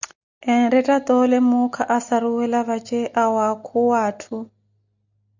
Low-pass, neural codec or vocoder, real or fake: 7.2 kHz; none; real